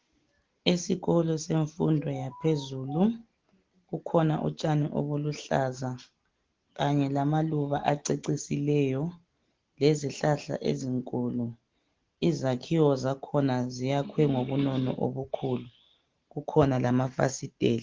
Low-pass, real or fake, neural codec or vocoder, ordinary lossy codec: 7.2 kHz; real; none; Opus, 16 kbps